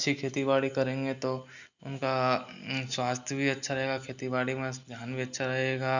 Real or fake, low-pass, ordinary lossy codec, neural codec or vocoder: real; 7.2 kHz; none; none